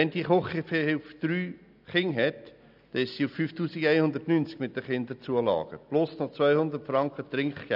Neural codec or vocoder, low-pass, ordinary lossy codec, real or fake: none; 5.4 kHz; none; real